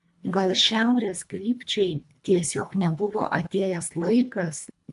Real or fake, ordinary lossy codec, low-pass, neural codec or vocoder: fake; AAC, 96 kbps; 10.8 kHz; codec, 24 kHz, 1.5 kbps, HILCodec